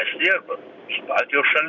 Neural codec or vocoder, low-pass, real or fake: none; 7.2 kHz; real